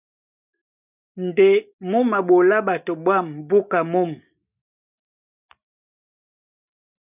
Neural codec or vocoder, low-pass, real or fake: none; 3.6 kHz; real